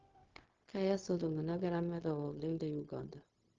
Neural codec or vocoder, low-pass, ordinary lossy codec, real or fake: codec, 16 kHz, 0.4 kbps, LongCat-Audio-Codec; 7.2 kHz; Opus, 16 kbps; fake